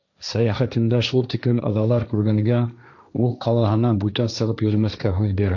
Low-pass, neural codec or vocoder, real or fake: 7.2 kHz; codec, 16 kHz, 1.1 kbps, Voila-Tokenizer; fake